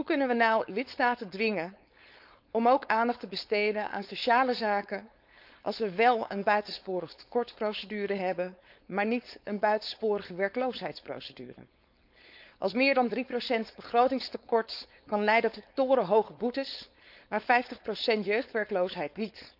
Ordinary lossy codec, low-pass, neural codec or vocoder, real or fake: none; 5.4 kHz; codec, 16 kHz, 4.8 kbps, FACodec; fake